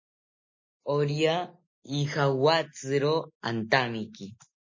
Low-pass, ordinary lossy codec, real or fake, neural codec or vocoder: 7.2 kHz; MP3, 32 kbps; real; none